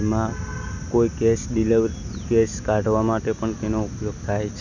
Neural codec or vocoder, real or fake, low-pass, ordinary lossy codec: none; real; 7.2 kHz; none